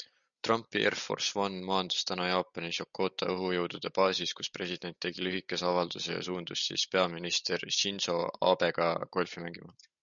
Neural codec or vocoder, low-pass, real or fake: none; 7.2 kHz; real